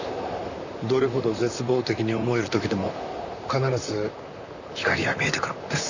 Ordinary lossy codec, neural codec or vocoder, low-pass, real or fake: none; vocoder, 44.1 kHz, 128 mel bands, Pupu-Vocoder; 7.2 kHz; fake